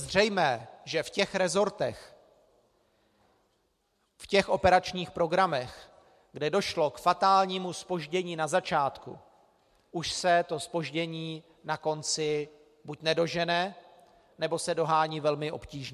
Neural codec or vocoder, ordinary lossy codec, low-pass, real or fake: none; MP3, 64 kbps; 14.4 kHz; real